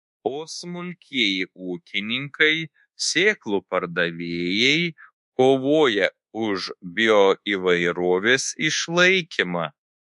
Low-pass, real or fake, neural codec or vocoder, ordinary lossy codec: 10.8 kHz; fake; codec, 24 kHz, 1.2 kbps, DualCodec; MP3, 64 kbps